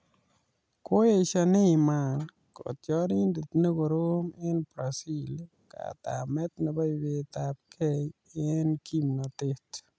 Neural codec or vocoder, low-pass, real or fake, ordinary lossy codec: none; none; real; none